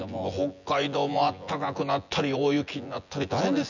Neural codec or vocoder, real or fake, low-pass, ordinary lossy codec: vocoder, 24 kHz, 100 mel bands, Vocos; fake; 7.2 kHz; none